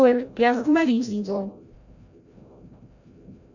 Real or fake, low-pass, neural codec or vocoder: fake; 7.2 kHz; codec, 16 kHz, 0.5 kbps, FreqCodec, larger model